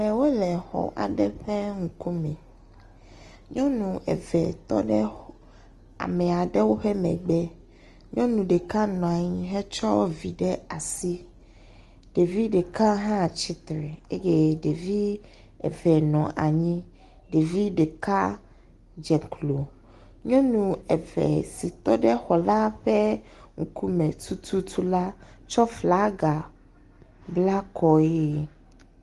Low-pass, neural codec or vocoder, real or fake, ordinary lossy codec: 10.8 kHz; none; real; Opus, 24 kbps